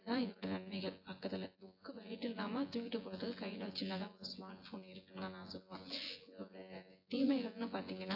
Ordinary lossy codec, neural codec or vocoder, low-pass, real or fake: AAC, 48 kbps; vocoder, 24 kHz, 100 mel bands, Vocos; 5.4 kHz; fake